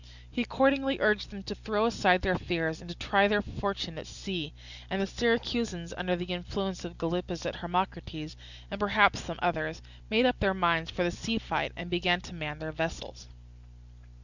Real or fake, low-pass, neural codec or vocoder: real; 7.2 kHz; none